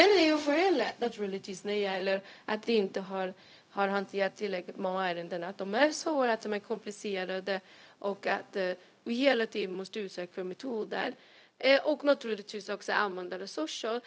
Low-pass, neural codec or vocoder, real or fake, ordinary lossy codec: none; codec, 16 kHz, 0.4 kbps, LongCat-Audio-Codec; fake; none